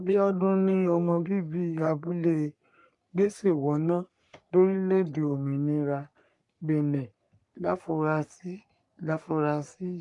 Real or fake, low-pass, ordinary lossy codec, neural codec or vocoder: fake; 10.8 kHz; MP3, 64 kbps; codec, 44.1 kHz, 3.4 kbps, Pupu-Codec